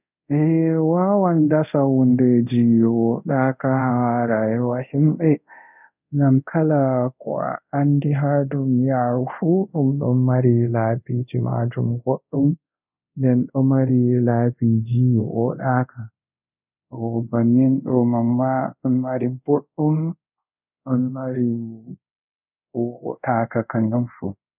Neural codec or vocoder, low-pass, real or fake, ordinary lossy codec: codec, 24 kHz, 0.5 kbps, DualCodec; 3.6 kHz; fake; none